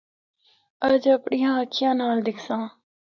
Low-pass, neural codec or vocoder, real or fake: 7.2 kHz; none; real